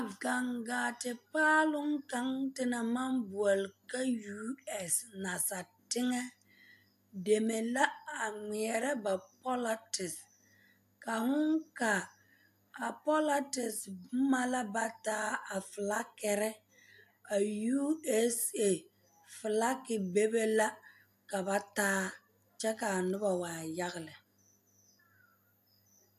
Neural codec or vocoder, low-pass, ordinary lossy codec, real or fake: none; 14.4 kHz; AAC, 96 kbps; real